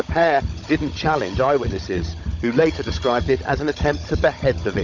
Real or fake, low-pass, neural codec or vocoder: fake; 7.2 kHz; codec, 16 kHz, 16 kbps, FunCodec, trained on Chinese and English, 50 frames a second